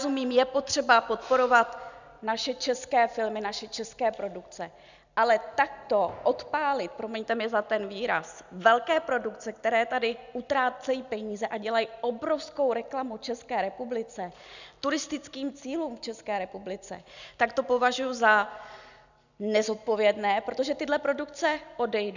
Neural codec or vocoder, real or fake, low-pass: none; real; 7.2 kHz